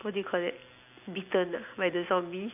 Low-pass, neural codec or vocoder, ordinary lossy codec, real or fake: 3.6 kHz; none; none; real